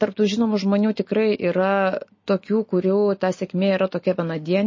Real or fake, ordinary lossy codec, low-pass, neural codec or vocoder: real; MP3, 32 kbps; 7.2 kHz; none